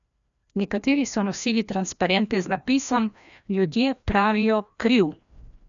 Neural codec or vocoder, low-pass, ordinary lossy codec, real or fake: codec, 16 kHz, 1 kbps, FreqCodec, larger model; 7.2 kHz; none; fake